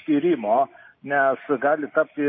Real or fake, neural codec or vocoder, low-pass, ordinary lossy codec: real; none; 7.2 kHz; MP3, 24 kbps